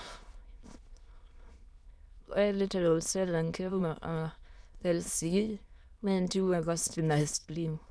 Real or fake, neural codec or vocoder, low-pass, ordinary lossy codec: fake; autoencoder, 22.05 kHz, a latent of 192 numbers a frame, VITS, trained on many speakers; none; none